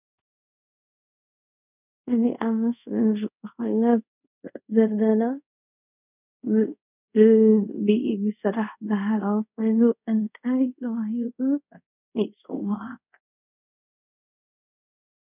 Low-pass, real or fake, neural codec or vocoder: 3.6 kHz; fake; codec, 24 kHz, 0.5 kbps, DualCodec